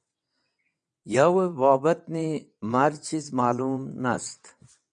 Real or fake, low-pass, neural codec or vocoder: fake; 9.9 kHz; vocoder, 22.05 kHz, 80 mel bands, WaveNeXt